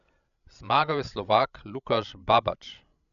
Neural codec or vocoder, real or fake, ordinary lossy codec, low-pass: codec, 16 kHz, 16 kbps, FreqCodec, larger model; fake; none; 7.2 kHz